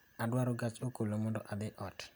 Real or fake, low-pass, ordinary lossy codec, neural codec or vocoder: real; none; none; none